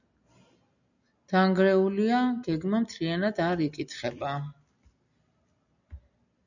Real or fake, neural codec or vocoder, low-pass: real; none; 7.2 kHz